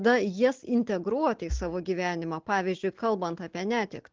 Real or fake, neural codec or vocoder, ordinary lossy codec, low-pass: real; none; Opus, 16 kbps; 7.2 kHz